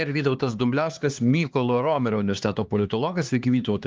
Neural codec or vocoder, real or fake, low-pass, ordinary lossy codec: codec, 16 kHz, 2 kbps, X-Codec, HuBERT features, trained on LibriSpeech; fake; 7.2 kHz; Opus, 32 kbps